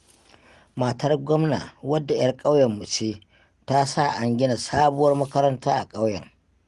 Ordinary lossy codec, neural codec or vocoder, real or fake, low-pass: Opus, 24 kbps; none; real; 10.8 kHz